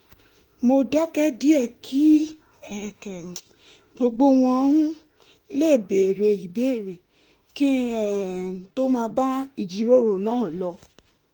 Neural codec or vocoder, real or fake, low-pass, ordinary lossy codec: autoencoder, 48 kHz, 32 numbers a frame, DAC-VAE, trained on Japanese speech; fake; 19.8 kHz; Opus, 24 kbps